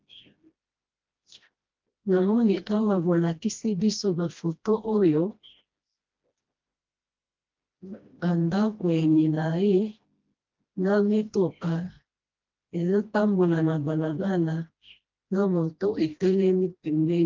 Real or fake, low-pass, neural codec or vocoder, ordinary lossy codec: fake; 7.2 kHz; codec, 16 kHz, 1 kbps, FreqCodec, smaller model; Opus, 32 kbps